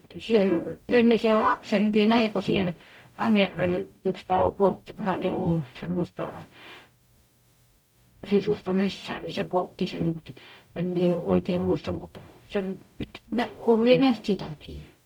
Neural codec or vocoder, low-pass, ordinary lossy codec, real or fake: codec, 44.1 kHz, 0.9 kbps, DAC; 19.8 kHz; none; fake